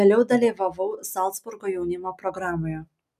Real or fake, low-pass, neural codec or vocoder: real; 14.4 kHz; none